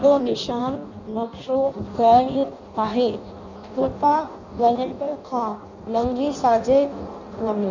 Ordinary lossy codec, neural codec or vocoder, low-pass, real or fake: none; codec, 16 kHz in and 24 kHz out, 0.6 kbps, FireRedTTS-2 codec; 7.2 kHz; fake